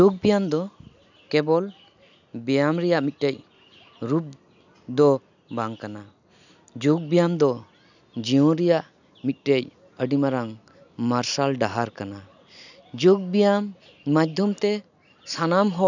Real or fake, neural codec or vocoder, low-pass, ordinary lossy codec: real; none; 7.2 kHz; none